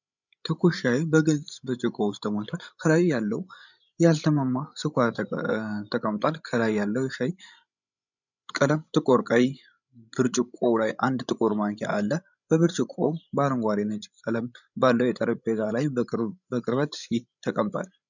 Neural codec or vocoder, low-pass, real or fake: codec, 16 kHz, 16 kbps, FreqCodec, larger model; 7.2 kHz; fake